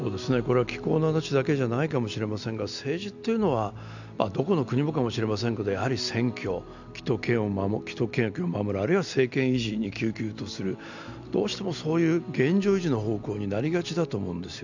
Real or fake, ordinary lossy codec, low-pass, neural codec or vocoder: real; none; 7.2 kHz; none